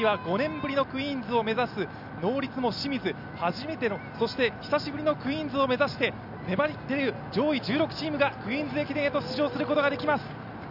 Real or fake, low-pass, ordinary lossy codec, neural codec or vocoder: real; 5.4 kHz; none; none